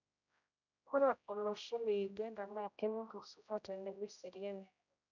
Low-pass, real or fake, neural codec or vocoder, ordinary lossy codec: 7.2 kHz; fake; codec, 16 kHz, 0.5 kbps, X-Codec, HuBERT features, trained on general audio; none